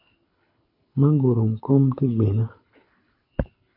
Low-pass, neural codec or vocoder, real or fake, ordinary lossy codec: 5.4 kHz; codec, 24 kHz, 6 kbps, HILCodec; fake; MP3, 32 kbps